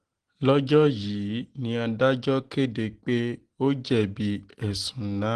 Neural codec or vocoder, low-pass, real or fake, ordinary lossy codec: none; 9.9 kHz; real; Opus, 16 kbps